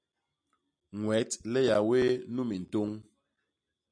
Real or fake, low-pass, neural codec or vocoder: real; 9.9 kHz; none